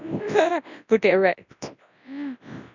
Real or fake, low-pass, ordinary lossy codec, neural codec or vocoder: fake; 7.2 kHz; none; codec, 24 kHz, 0.9 kbps, WavTokenizer, large speech release